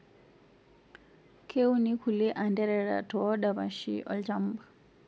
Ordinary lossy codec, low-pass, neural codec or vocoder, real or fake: none; none; none; real